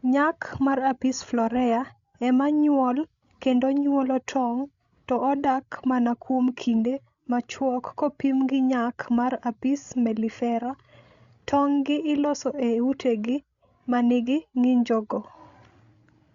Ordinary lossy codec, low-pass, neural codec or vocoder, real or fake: Opus, 64 kbps; 7.2 kHz; codec, 16 kHz, 16 kbps, FreqCodec, larger model; fake